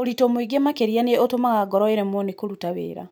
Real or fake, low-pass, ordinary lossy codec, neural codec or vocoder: real; none; none; none